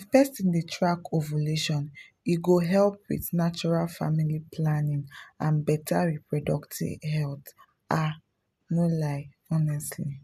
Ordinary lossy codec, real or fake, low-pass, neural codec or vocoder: none; real; 14.4 kHz; none